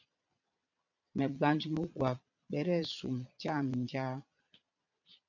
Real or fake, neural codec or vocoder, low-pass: fake; vocoder, 22.05 kHz, 80 mel bands, Vocos; 7.2 kHz